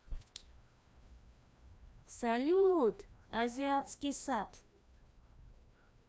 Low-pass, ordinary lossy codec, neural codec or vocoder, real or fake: none; none; codec, 16 kHz, 1 kbps, FreqCodec, larger model; fake